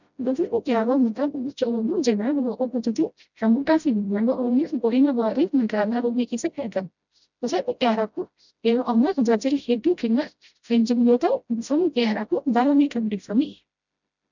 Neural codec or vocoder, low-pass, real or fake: codec, 16 kHz, 0.5 kbps, FreqCodec, smaller model; 7.2 kHz; fake